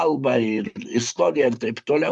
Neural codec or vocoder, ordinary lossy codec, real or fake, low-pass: vocoder, 44.1 kHz, 128 mel bands, Pupu-Vocoder; MP3, 96 kbps; fake; 10.8 kHz